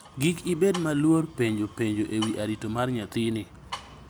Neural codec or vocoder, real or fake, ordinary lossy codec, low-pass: none; real; none; none